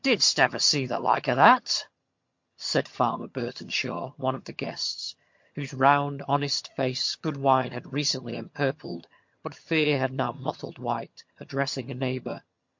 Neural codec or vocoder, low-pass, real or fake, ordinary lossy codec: vocoder, 22.05 kHz, 80 mel bands, HiFi-GAN; 7.2 kHz; fake; MP3, 48 kbps